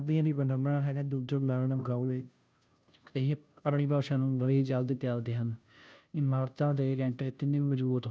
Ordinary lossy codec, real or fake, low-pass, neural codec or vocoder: none; fake; none; codec, 16 kHz, 0.5 kbps, FunCodec, trained on Chinese and English, 25 frames a second